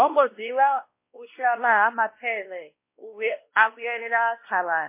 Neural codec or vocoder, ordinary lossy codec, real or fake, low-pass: codec, 16 kHz, 1 kbps, X-Codec, WavLM features, trained on Multilingual LibriSpeech; MP3, 24 kbps; fake; 3.6 kHz